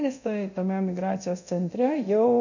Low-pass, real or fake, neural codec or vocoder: 7.2 kHz; fake; codec, 24 kHz, 0.9 kbps, DualCodec